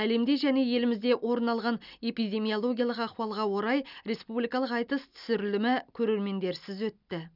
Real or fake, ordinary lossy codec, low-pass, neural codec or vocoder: real; none; 5.4 kHz; none